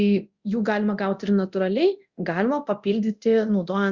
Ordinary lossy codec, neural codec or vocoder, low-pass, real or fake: Opus, 64 kbps; codec, 24 kHz, 0.9 kbps, DualCodec; 7.2 kHz; fake